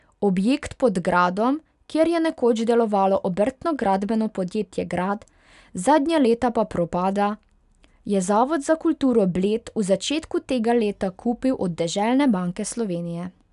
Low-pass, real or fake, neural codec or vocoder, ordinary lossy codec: 10.8 kHz; real; none; none